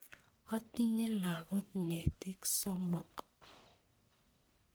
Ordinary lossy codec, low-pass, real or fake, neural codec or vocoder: none; none; fake; codec, 44.1 kHz, 1.7 kbps, Pupu-Codec